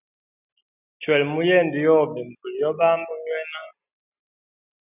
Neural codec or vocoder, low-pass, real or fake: none; 3.6 kHz; real